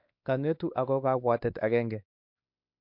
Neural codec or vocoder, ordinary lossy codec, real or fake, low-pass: codec, 16 kHz, 2 kbps, X-Codec, WavLM features, trained on Multilingual LibriSpeech; MP3, 48 kbps; fake; 5.4 kHz